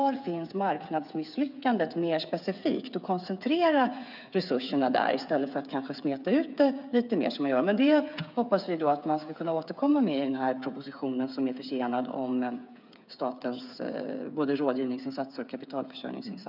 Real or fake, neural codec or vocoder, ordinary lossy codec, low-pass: fake; codec, 16 kHz, 8 kbps, FreqCodec, smaller model; none; 5.4 kHz